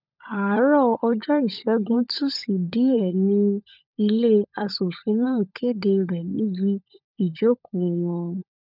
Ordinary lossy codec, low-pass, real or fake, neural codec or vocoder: none; 5.4 kHz; fake; codec, 16 kHz, 16 kbps, FunCodec, trained on LibriTTS, 50 frames a second